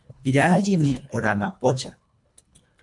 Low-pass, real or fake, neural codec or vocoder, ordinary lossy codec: 10.8 kHz; fake; codec, 24 kHz, 1.5 kbps, HILCodec; MP3, 64 kbps